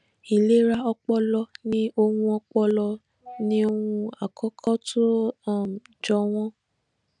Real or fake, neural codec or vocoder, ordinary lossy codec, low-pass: real; none; none; 9.9 kHz